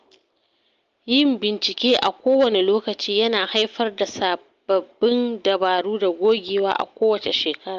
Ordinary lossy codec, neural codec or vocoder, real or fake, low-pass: Opus, 32 kbps; none; real; 7.2 kHz